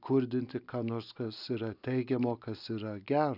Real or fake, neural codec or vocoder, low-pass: real; none; 5.4 kHz